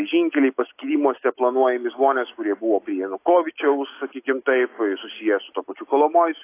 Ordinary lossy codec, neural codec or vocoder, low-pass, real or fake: AAC, 24 kbps; none; 3.6 kHz; real